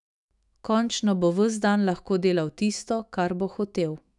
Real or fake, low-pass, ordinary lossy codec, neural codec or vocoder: fake; 10.8 kHz; none; autoencoder, 48 kHz, 128 numbers a frame, DAC-VAE, trained on Japanese speech